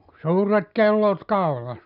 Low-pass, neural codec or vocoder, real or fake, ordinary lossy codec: 5.4 kHz; none; real; none